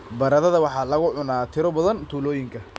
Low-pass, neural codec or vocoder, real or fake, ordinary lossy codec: none; none; real; none